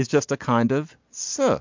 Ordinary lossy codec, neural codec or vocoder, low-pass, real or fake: MP3, 64 kbps; none; 7.2 kHz; real